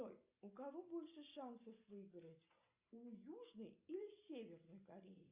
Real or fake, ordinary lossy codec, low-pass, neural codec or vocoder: real; Opus, 64 kbps; 3.6 kHz; none